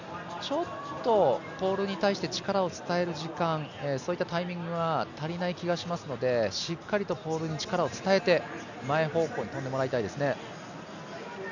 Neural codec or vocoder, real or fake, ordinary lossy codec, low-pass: none; real; none; 7.2 kHz